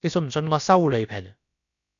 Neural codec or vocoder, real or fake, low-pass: codec, 16 kHz, about 1 kbps, DyCAST, with the encoder's durations; fake; 7.2 kHz